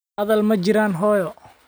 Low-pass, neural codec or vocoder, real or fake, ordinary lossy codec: none; none; real; none